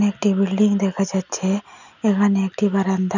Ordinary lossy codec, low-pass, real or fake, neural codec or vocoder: none; 7.2 kHz; real; none